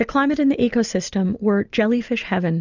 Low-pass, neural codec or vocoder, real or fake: 7.2 kHz; none; real